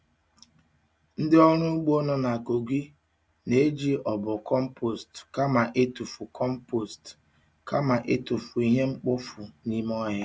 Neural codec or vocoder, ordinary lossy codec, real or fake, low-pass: none; none; real; none